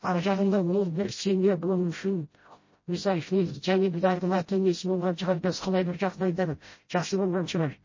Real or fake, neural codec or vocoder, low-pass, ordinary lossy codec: fake; codec, 16 kHz, 0.5 kbps, FreqCodec, smaller model; 7.2 kHz; MP3, 32 kbps